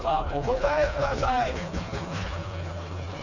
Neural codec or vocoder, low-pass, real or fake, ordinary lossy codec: codec, 16 kHz, 2 kbps, FreqCodec, smaller model; 7.2 kHz; fake; none